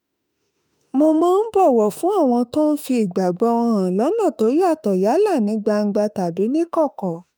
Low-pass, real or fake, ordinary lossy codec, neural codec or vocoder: none; fake; none; autoencoder, 48 kHz, 32 numbers a frame, DAC-VAE, trained on Japanese speech